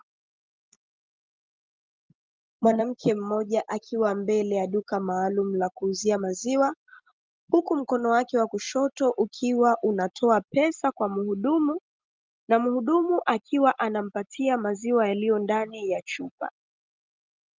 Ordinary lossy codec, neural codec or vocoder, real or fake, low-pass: Opus, 32 kbps; none; real; 7.2 kHz